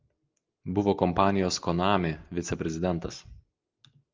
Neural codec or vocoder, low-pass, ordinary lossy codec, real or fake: none; 7.2 kHz; Opus, 32 kbps; real